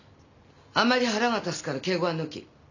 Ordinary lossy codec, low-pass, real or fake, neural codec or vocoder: none; 7.2 kHz; real; none